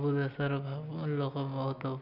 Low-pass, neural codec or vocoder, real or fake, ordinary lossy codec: 5.4 kHz; none; real; none